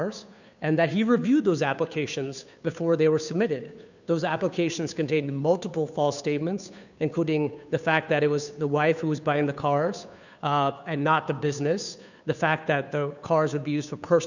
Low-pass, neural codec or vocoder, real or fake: 7.2 kHz; codec, 16 kHz, 2 kbps, FunCodec, trained on Chinese and English, 25 frames a second; fake